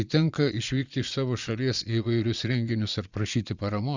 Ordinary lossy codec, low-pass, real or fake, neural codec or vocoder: Opus, 64 kbps; 7.2 kHz; fake; vocoder, 22.05 kHz, 80 mel bands, Vocos